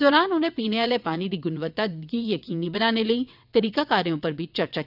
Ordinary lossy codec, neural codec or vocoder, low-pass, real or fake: none; vocoder, 22.05 kHz, 80 mel bands, WaveNeXt; 5.4 kHz; fake